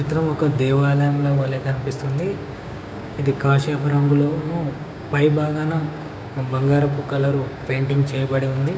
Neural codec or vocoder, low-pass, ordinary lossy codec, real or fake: codec, 16 kHz, 6 kbps, DAC; none; none; fake